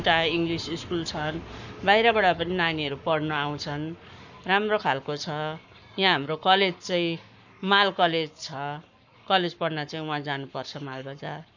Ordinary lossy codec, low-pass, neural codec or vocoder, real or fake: none; 7.2 kHz; codec, 44.1 kHz, 7.8 kbps, Pupu-Codec; fake